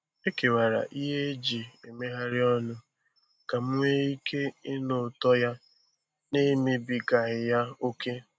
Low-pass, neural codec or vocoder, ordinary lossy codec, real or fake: none; none; none; real